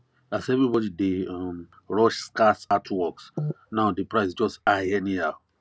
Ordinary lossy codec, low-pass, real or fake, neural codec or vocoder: none; none; real; none